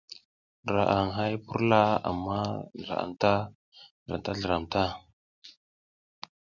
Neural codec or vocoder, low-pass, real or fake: none; 7.2 kHz; real